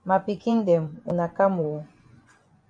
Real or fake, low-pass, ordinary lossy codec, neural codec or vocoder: fake; 9.9 kHz; AAC, 64 kbps; vocoder, 24 kHz, 100 mel bands, Vocos